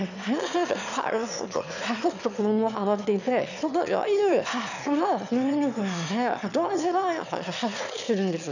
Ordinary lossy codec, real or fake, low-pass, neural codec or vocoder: none; fake; 7.2 kHz; autoencoder, 22.05 kHz, a latent of 192 numbers a frame, VITS, trained on one speaker